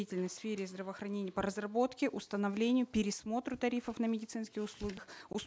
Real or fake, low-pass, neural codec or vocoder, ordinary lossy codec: real; none; none; none